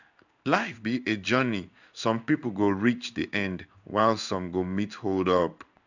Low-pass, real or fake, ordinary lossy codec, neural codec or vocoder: 7.2 kHz; fake; none; codec, 16 kHz in and 24 kHz out, 1 kbps, XY-Tokenizer